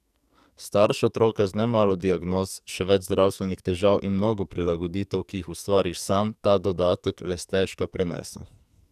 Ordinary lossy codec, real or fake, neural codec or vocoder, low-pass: none; fake; codec, 44.1 kHz, 2.6 kbps, SNAC; 14.4 kHz